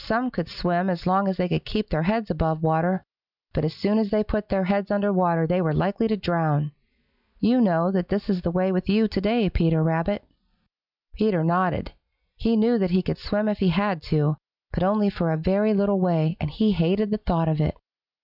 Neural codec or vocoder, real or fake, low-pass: none; real; 5.4 kHz